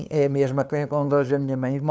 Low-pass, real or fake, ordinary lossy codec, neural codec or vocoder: none; fake; none; codec, 16 kHz, 2 kbps, FunCodec, trained on LibriTTS, 25 frames a second